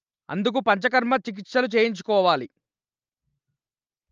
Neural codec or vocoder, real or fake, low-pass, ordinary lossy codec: none; real; 7.2 kHz; Opus, 24 kbps